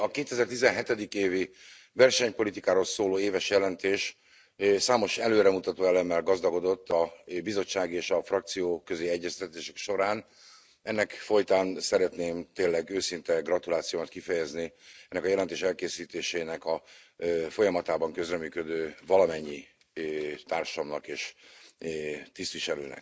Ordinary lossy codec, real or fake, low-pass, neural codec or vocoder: none; real; none; none